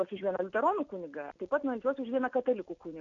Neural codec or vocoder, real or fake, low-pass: none; real; 7.2 kHz